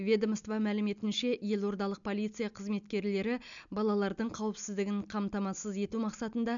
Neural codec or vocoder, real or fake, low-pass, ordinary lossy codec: none; real; 7.2 kHz; MP3, 96 kbps